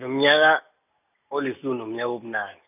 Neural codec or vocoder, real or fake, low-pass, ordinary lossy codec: none; real; 3.6 kHz; none